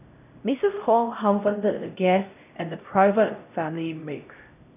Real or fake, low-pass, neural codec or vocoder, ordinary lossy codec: fake; 3.6 kHz; codec, 16 kHz, 0.5 kbps, X-Codec, HuBERT features, trained on LibriSpeech; none